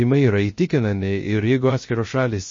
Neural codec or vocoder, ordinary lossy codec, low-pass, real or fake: codec, 16 kHz, 0.3 kbps, FocalCodec; MP3, 32 kbps; 7.2 kHz; fake